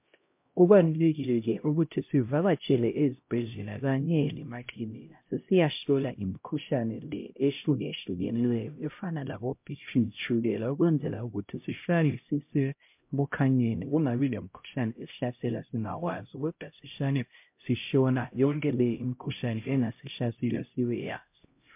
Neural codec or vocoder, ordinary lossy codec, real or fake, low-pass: codec, 16 kHz, 0.5 kbps, X-Codec, HuBERT features, trained on LibriSpeech; MP3, 32 kbps; fake; 3.6 kHz